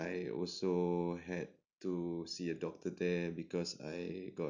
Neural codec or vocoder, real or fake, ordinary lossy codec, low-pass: none; real; none; 7.2 kHz